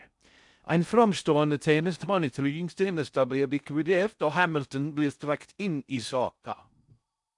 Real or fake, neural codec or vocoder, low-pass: fake; codec, 16 kHz in and 24 kHz out, 0.6 kbps, FocalCodec, streaming, 2048 codes; 10.8 kHz